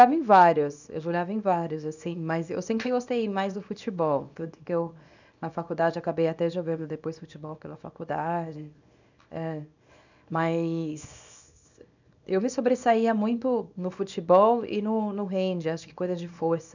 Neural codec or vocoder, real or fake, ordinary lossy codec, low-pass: codec, 24 kHz, 0.9 kbps, WavTokenizer, small release; fake; none; 7.2 kHz